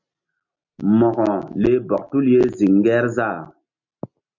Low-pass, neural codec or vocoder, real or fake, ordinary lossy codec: 7.2 kHz; none; real; MP3, 48 kbps